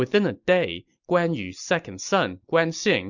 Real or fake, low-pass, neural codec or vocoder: real; 7.2 kHz; none